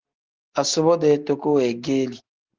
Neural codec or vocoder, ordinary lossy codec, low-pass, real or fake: none; Opus, 16 kbps; 7.2 kHz; real